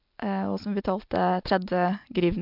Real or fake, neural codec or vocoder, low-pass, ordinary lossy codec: real; none; 5.4 kHz; MP3, 48 kbps